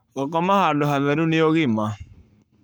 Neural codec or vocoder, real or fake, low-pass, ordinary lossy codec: codec, 44.1 kHz, 7.8 kbps, Pupu-Codec; fake; none; none